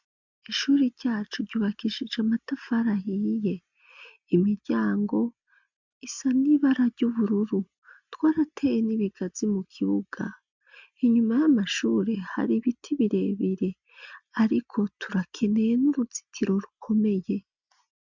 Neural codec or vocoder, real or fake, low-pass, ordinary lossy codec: none; real; 7.2 kHz; MP3, 64 kbps